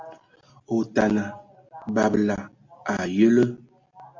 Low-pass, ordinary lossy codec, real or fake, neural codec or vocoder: 7.2 kHz; MP3, 48 kbps; real; none